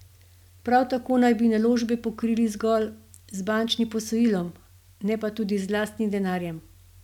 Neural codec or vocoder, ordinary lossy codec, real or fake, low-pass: none; none; real; 19.8 kHz